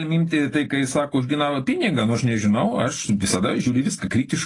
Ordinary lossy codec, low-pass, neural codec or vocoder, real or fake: AAC, 32 kbps; 10.8 kHz; none; real